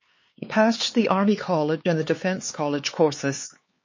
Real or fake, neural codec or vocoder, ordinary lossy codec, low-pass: fake; codec, 16 kHz, 4 kbps, X-Codec, HuBERT features, trained on LibriSpeech; MP3, 32 kbps; 7.2 kHz